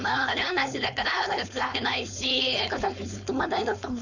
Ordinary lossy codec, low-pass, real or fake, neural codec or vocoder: none; 7.2 kHz; fake; codec, 16 kHz, 4.8 kbps, FACodec